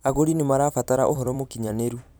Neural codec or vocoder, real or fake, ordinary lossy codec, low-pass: vocoder, 44.1 kHz, 128 mel bands every 256 samples, BigVGAN v2; fake; none; none